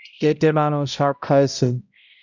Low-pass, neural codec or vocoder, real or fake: 7.2 kHz; codec, 16 kHz, 0.5 kbps, X-Codec, HuBERT features, trained on balanced general audio; fake